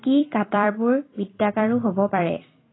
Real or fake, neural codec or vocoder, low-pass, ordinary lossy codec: fake; vocoder, 44.1 kHz, 128 mel bands every 256 samples, BigVGAN v2; 7.2 kHz; AAC, 16 kbps